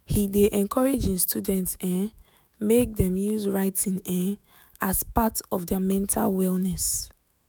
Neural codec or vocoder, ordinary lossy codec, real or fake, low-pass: autoencoder, 48 kHz, 128 numbers a frame, DAC-VAE, trained on Japanese speech; none; fake; none